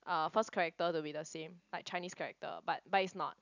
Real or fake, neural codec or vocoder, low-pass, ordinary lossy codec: real; none; 7.2 kHz; none